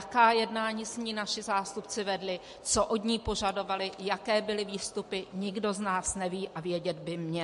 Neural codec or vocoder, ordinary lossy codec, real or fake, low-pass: none; MP3, 48 kbps; real; 14.4 kHz